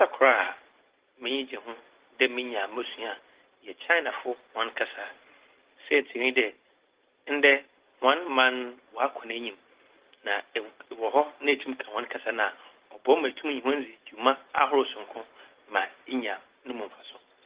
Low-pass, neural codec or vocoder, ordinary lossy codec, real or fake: 3.6 kHz; none; Opus, 16 kbps; real